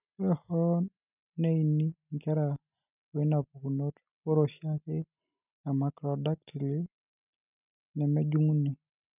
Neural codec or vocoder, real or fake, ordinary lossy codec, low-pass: none; real; none; 3.6 kHz